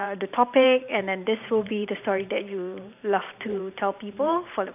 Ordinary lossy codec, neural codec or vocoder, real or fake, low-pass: none; vocoder, 44.1 kHz, 128 mel bands every 512 samples, BigVGAN v2; fake; 3.6 kHz